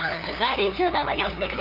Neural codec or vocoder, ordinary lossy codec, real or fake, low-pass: codec, 16 kHz, 2 kbps, FunCodec, trained on LibriTTS, 25 frames a second; none; fake; 5.4 kHz